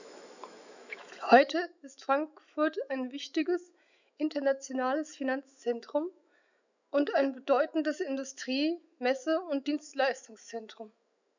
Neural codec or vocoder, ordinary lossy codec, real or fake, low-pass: autoencoder, 48 kHz, 128 numbers a frame, DAC-VAE, trained on Japanese speech; none; fake; 7.2 kHz